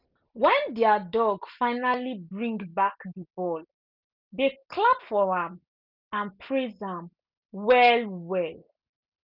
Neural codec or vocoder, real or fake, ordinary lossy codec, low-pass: none; real; none; 5.4 kHz